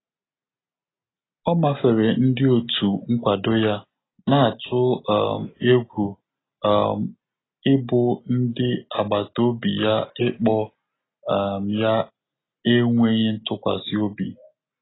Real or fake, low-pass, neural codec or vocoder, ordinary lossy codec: real; 7.2 kHz; none; AAC, 16 kbps